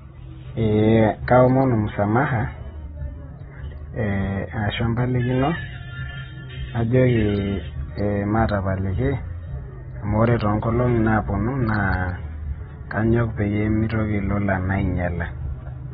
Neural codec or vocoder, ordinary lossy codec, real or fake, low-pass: none; AAC, 16 kbps; real; 7.2 kHz